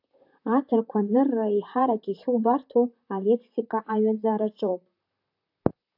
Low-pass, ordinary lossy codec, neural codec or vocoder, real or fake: 5.4 kHz; AAC, 48 kbps; vocoder, 44.1 kHz, 128 mel bands, Pupu-Vocoder; fake